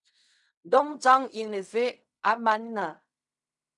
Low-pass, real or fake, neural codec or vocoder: 10.8 kHz; fake; codec, 16 kHz in and 24 kHz out, 0.4 kbps, LongCat-Audio-Codec, fine tuned four codebook decoder